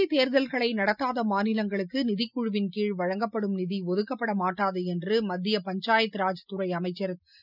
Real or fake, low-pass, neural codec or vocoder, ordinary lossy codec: real; 5.4 kHz; none; none